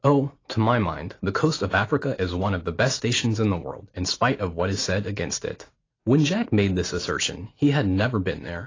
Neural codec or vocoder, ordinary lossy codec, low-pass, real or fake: none; AAC, 32 kbps; 7.2 kHz; real